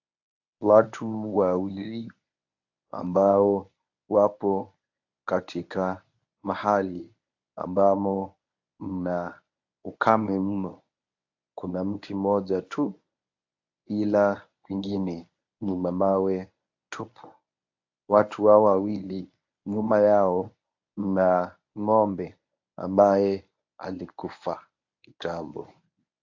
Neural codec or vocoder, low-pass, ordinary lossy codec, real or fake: codec, 24 kHz, 0.9 kbps, WavTokenizer, medium speech release version 1; 7.2 kHz; AAC, 48 kbps; fake